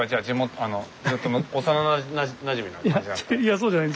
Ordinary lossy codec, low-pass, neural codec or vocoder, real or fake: none; none; none; real